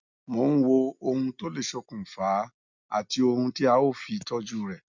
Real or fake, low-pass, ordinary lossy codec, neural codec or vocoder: real; 7.2 kHz; none; none